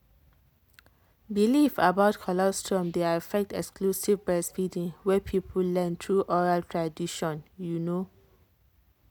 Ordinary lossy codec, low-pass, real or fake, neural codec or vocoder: none; none; real; none